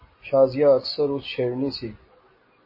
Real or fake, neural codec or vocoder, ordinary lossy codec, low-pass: real; none; MP3, 24 kbps; 5.4 kHz